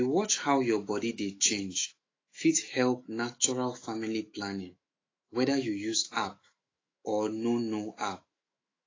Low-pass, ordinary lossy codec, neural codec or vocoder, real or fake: 7.2 kHz; AAC, 32 kbps; autoencoder, 48 kHz, 128 numbers a frame, DAC-VAE, trained on Japanese speech; fake